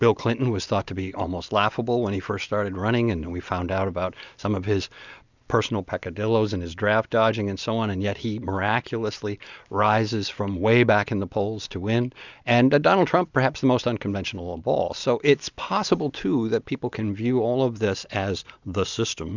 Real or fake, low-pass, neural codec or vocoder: real; 7.2 kHz; none